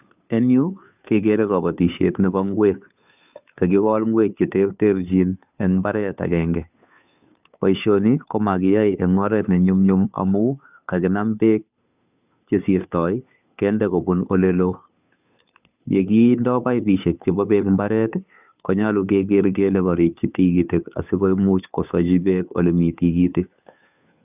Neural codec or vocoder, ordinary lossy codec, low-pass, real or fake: codec, 16 kHz, 2 kbps, FunCodec, trained on Chinese and English, 25 frames a second; none; 3.6 kHz; fake